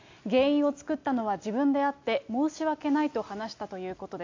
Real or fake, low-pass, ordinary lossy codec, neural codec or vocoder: real; 7.2 kHz; AAC, 48 kbps; none